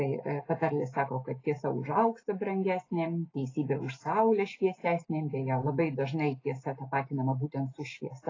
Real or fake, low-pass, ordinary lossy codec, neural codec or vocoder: real; 7.2 kHz; AAC, 32 kbps; none